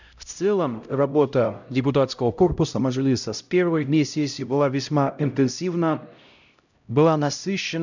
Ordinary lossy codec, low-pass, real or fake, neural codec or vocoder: none; 7.2 kHz; fake; codec, 16 kHz, 0.5 kbps, X-Codec, HuBERT features, trained on LibriSpeech